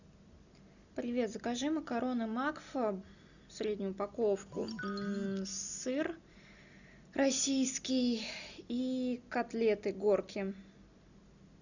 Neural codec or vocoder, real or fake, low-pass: none; real; 7.2 kHz